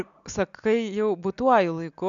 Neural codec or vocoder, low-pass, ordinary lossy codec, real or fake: none; 7.2 kHz; MP3, 96 kbps; real